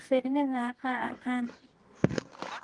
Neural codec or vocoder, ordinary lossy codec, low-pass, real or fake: codec, 24 kHz, 0.9 kbps, WavTokenizer, medium music audio release; Opus, 24 kbps; 10.8 kHz; fake